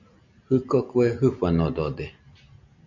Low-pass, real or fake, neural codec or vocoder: 7.2 kHz; real; none